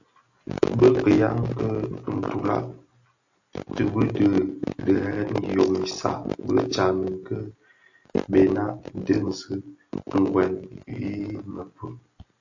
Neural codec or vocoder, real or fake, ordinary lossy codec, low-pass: none; real; AAC, 48 kbps; 7.2 kHz